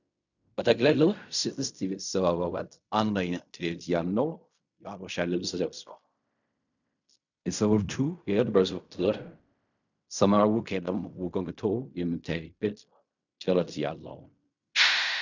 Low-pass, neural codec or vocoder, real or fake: 7.2 kHz; codec, 16 kHz in and 24 kHz out, 0.4 kbps, LongCat-Audio-Codec, fine tuned four codebook decoder; fake